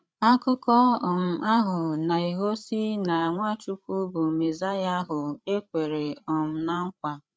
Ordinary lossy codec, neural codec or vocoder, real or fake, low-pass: none; codec, 16 kHz, 8 kbps, FreqCodec, larger model; fake; none